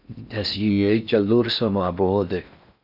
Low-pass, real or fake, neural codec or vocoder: 5.4 kHz; fake; codec, 16 kHz in and 24 kHz out, 0.6 kbps, FocalCodec, streaming, 4096 codes